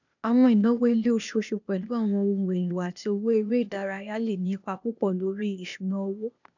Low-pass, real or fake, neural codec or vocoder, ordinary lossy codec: 7.2 kHz; fake; codec, 16 kHz, 0.8 kbps, ZipCodec; none